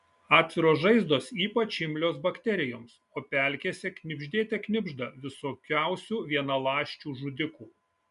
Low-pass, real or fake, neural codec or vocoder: 10.8 kHz; real; none